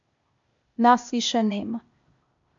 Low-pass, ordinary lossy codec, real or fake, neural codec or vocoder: 7.2 kHz; MP3, 64 kbps; fake; codec, 16 kHz, 0.8 kbps, ZipCodec